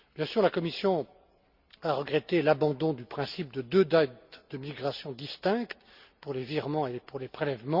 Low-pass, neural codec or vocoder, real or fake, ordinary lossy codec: 5.4 kHz; none; real; Opus, 64 kbps